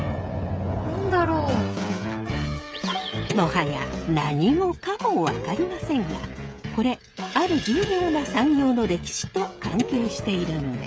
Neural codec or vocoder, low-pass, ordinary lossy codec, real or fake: codec, 16 kHz, 16 kbps, FreqCodec, smaller model; none; none; fake